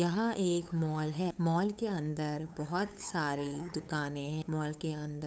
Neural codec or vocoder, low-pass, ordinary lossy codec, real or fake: codec, 16 kHz, 8 kbps, FunCodec, trained on LibriTTS, 25 frames a second; none; none; fake